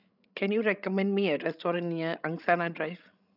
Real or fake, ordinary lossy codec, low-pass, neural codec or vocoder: fake; none; 5.4 kHz; codec, 16 kHz, 16 kbps, FreqCodec, larger model